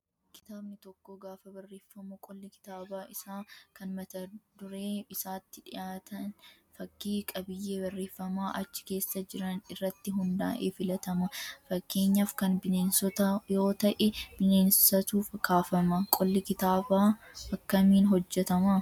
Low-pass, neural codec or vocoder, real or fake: 19.8 kHz; none; real